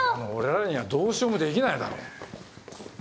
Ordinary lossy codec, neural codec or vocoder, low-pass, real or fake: none; none; none; real